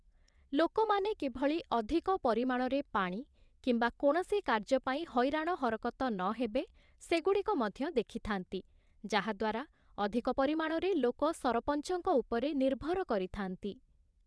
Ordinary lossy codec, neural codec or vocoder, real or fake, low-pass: none; vocoder, 22.05 kHz, 80 mel bands, WaveNeXt; fake; none